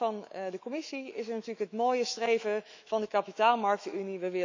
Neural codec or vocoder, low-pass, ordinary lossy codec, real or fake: autoencoder, 48 kHz, 128 numbers a frame, DAC-VAE, trained on Japanese speech; 7.2 kHz; MP3, 64 kbps; fake